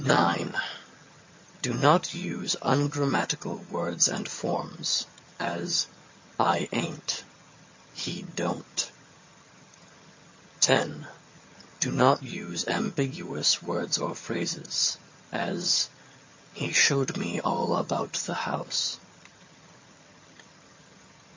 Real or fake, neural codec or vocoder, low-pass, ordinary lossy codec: fake; vocoder, 22.05 kHz, 80 mel bands, HiFi-GAN; 7.2 kHz; MP3, 32 kbps